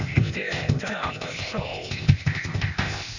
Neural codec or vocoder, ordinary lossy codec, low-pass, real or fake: codec, 16 kHz, 0.8 kbps, ZipCodec; none; 7.2 kHz; fake